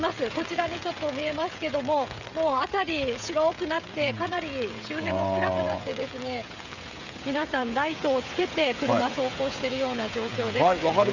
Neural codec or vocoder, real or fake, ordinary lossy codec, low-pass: codec, 16 kHz, 16 kbps, FreqCodec, smaller model; fake; none; 7.2 kHz